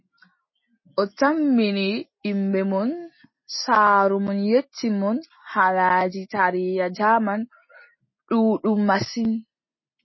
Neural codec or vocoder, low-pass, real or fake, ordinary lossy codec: none; 7.2 kHz; real; MP3, 24 kbps